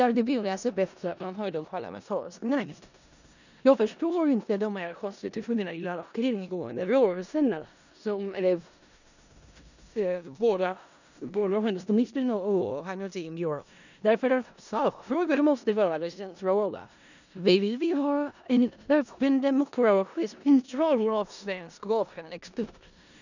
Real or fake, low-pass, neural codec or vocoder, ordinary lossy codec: fake; 7.2 kHz; codec, 16 kHz in and 24 kHz out, 0.4 kbps, LongCat-Audio-Codec, four codebook decoder; none